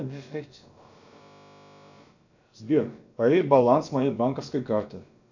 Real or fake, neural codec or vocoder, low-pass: fake; codec, 16 kHz, about 1 kbps, DyCAST, with the encoder's durations; 7.2 kHz